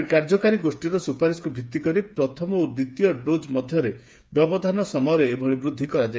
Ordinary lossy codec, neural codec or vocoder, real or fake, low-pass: none; codec, 16 kHz, 8 kbps, FreqCodec, smaller model; fake; none